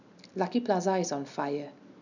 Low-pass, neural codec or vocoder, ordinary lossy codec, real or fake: 7.2 kHz; none; none; real